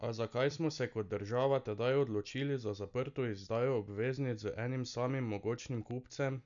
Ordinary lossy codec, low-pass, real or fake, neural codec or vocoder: none; 7.2 kHz; real; none